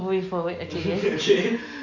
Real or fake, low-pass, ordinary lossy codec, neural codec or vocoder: real; 7.2 kHz; none; none